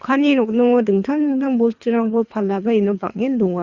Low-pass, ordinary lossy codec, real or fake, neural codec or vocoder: 7.2 kHz; Opus, 64 kbps; fake; codec, 24 kHz, 3 kbps, HILCodec